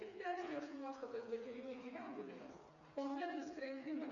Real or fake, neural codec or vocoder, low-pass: fake; codec, 16 kHz, 4 kbps, FreqCodec, smaller model; 7.2 kHz